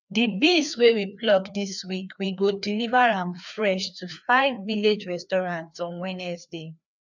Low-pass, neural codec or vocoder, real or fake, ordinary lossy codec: 7.2 kHz; codec, 16 kHz, 2 kbps, FreqCodec, larger model; fake; none